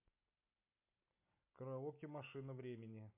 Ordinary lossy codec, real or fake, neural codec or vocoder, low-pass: MP3, 32 kbps; real; none; 3.6 kHz